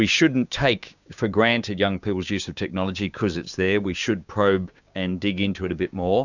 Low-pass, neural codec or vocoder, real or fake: 7.2 kHz; codec, 16 kHz, 6 kbps, DAC; fake